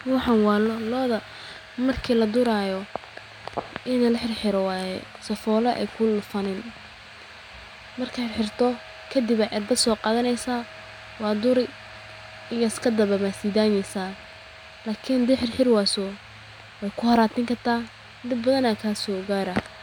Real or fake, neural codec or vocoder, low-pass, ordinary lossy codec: real; none; 19.8 kHz; none